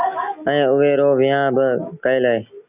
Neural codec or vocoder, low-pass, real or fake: none; 3.6 kHz; real